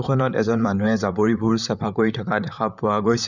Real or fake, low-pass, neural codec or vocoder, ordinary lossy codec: fake; 7.2 kHz; vocoder, 44.1 kHz, 128 mel bands, Pupu-Vocoder; none